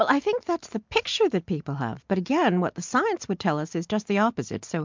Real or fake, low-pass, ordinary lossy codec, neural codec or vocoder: real; 7.2 kHz; MP3, 64 kbps; none